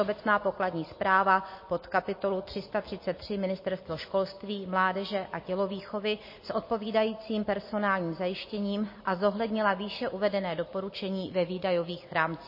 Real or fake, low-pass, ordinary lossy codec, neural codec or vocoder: real; 5.4 kHz; MP3, 24 kbps; none